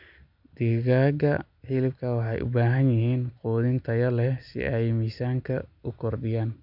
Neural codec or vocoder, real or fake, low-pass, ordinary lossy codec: none; real; 5.4 kHz; none